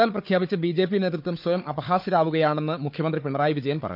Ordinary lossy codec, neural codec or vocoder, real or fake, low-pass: none; codec, 16 kHz, 4 kbps, FunCodec, trained on Chinese and English, 50 frames a second; fake; 5.4 kHz